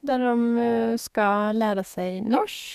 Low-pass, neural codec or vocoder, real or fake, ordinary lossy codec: 14.4 kHz; codec, 32 kHz, 1.9 kbps, SNAC; fake; none